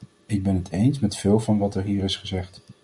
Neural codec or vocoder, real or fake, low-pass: none; real; 10.8 kHz